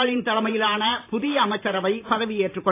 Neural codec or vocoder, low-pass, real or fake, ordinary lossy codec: vocoder, 44.1 kHz, 128 mel bands every 512 samples, BigVGAN v2; 3.6 kHz; fake; AAC, 24 kbps